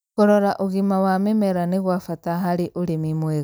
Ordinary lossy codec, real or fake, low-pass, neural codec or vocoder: none; real; none; none